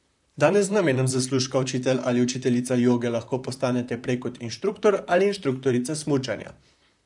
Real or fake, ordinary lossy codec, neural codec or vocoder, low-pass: fake; MP3, 96 kbps; vocoder, 44.1 kHz, 128 mel bands, Pupu-Vocoder; 10.8 kHz